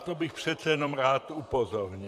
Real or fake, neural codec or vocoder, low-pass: fake; vocoder, 44.1 kHz, 128 mel bands, Pupu-Vocoder; 14.4 kHz